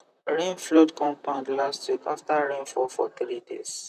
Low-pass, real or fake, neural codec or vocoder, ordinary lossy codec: 14.4 kHz; fake; vocoder, 44.1 kHz, 128 mel bands, Pupu-Vocoder; none